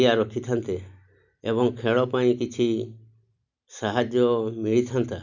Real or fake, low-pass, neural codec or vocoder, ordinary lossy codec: real; 7.2 kHz; none; none